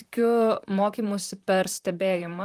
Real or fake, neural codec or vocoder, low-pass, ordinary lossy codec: fake; vocoder, 44.1 kHz, 128 mel bands, Pupu-Vocoder; 14.4 kHz; Opus, 32 kbps